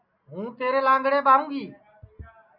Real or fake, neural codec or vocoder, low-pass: real; none; 5.4 kHz